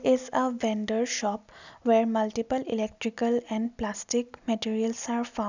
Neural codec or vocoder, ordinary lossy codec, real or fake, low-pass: none; none; real; 7.2 kHz